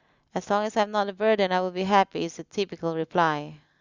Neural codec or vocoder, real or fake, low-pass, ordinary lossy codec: none; real; 7.2 kHz; Opus, 64 kbps